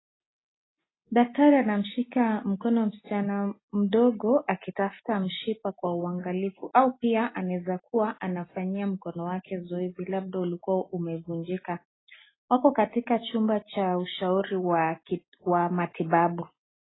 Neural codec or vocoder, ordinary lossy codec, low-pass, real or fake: none; AAC, 16 kbps; 7.2 kHz; real